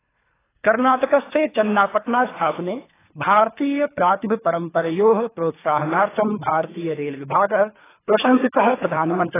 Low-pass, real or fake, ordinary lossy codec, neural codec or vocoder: 3.6 kHz; fake; AAC, 16 kbps; codec, 24 kHz, 3 kbps, HILCodec